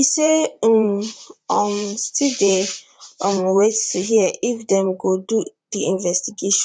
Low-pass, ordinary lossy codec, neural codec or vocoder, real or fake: 9.9 kHz; none; vocoder, 44.1 kHz, 128 mel bands, Pupu-Vocoder; fake